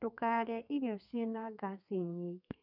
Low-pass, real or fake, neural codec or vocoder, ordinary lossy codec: 5.4 kHz; fake; codec, 32 kHz, 1.9 kbps, SNAC; none